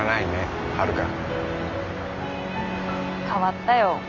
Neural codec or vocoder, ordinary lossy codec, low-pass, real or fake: none; none; 7.2 kHz; real